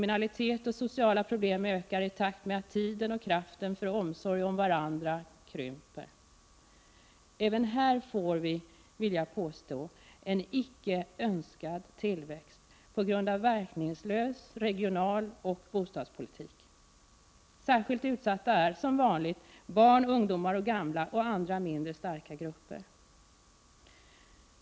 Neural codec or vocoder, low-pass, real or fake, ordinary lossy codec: none; none; real; none